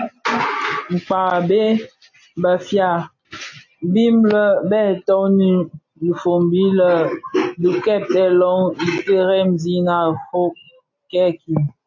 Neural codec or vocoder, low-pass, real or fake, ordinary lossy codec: none; 7.2 kHz; real; AAC, 48 kbps